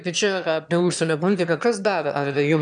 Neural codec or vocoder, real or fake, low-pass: autoencoder, 22.05 kHz, a latent of 192 numbers a frame, VITS, trained on one speaker; fake; 9.9 kHz